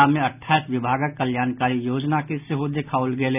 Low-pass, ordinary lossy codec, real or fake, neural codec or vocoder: 3.6 kHz; none; real; none